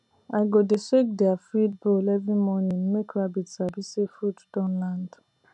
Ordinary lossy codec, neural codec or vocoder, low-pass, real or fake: none; none; 10.8 kHz; real